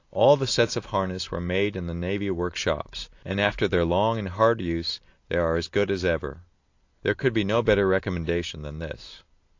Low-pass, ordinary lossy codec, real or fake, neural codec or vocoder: 7.2 kHz; AAC, 48 kbps; real; none